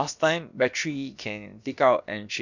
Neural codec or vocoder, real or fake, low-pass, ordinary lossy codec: codec, 16 kHz, about 1 kbps, DyCAST, with the encoder's durations; fake; 7.2 kHz; none